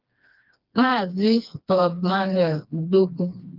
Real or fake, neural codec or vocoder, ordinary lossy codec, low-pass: fake; codec, 16 kHz, 2 kbps, FreqCodec, smaller model; Opus, 24 kbps; 5.4 kHz